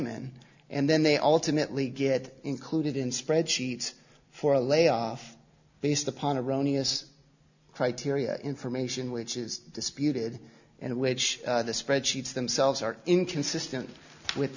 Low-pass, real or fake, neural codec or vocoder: 7.2 kHz; real; none